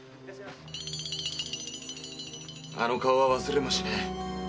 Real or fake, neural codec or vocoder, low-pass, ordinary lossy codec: real; none; none; none